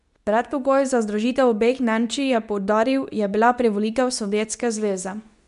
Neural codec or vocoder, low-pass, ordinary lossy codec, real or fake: codec, 24 kHz, 0.9 kbps, WavTokenizer, medium speech release version 2; 10.8 kHz; none; fake